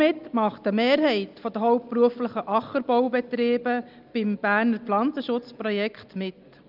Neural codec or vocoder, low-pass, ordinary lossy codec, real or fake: none; 5.4 kHz; Opus, 24 kbps; real